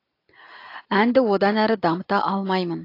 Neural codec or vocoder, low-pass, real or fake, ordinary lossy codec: none; 5.4 kHz; real; AAC, 32 kbps